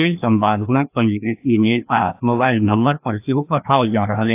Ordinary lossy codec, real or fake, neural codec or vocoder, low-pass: AAC, 32 kbps; fake; codec, 16 kHz, 1 kbps, FreqCodec, larger model; 3.6 kHz